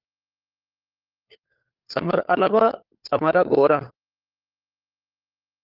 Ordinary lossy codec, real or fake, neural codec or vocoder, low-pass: Opus, 32 kbps; fake; codec, 16 kHz, 4 kbps, FunCodec, trained on LibriTTS, 50 frames a second; 5.4 kHz